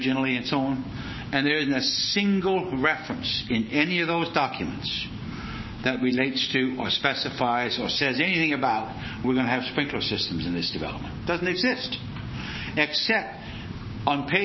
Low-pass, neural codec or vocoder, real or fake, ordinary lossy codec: 7.2 kHz; codec, 16 kHz, 6 kbps, DAC; fake; MP3, 24 kbps